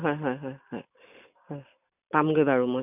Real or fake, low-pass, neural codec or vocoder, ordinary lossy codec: real; 3.6 kHz; none; none